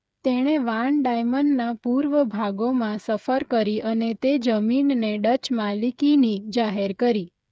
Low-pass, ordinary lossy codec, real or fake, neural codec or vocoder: none; none; fake; codec, 16 kHz, 8 kbps, FreqCodec, smaller model